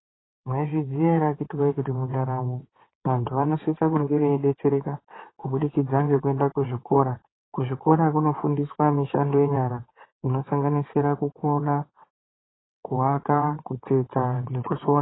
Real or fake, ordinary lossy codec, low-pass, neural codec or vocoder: fake; AAC, 16 kbps; 7.2 kHz; vocoder, 22.05 kHz, 80 mel bands, WaveNeXt